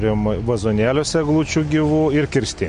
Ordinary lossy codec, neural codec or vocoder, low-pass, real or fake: MP3, 48 kbps; none; 9.9 kHz; real